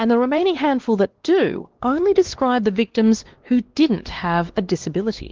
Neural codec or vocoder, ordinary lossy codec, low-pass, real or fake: codec, 16 kHz, 2 kbps, X-Codec, WavLM features, trained on Multilingual LibriSpeech; Opus, 16 kbps; 7.2 kHz; fake